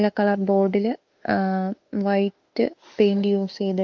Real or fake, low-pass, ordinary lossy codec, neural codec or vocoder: fake; 7.2 kHz; Opus, 24 kbps; autoencoder, 48 kHz, 32 numbers a frame, DAC-VAE, trained on Japanese speech